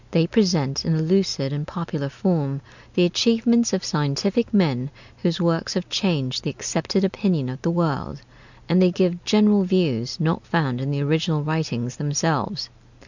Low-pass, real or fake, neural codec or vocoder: 7.2 kHz; real; none